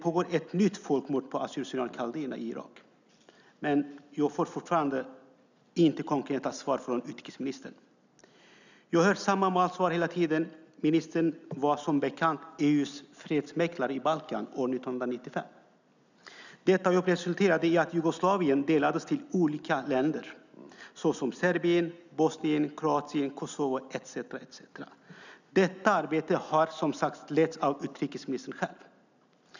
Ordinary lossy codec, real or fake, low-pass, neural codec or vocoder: none; real; 7.2 kHz; none